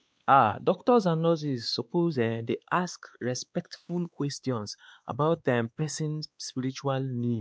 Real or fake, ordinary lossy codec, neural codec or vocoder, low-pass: fake; none; codec, 16 kHz, 2 kbps, X-Codec, WavLM features, trained on Multilingual LibriSpeech; none